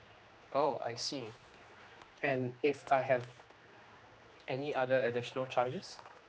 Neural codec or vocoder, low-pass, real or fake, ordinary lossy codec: codec, 16 kHz, 2 kbps, X-Codec, HuBERT features, trained on general audio; none; fake; none